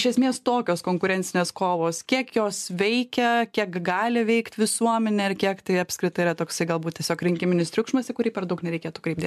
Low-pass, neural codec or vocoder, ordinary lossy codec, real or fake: 14.4 kHz; none; MP3, 96 kbps; real